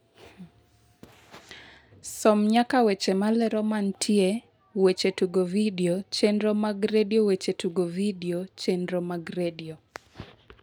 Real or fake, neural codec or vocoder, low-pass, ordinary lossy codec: real; none; none; none